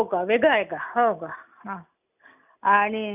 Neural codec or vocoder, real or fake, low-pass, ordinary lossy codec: none; real; 3.6 kHz; none